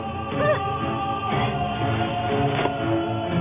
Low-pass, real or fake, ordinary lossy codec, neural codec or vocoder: 3.6 kHz; real; none; none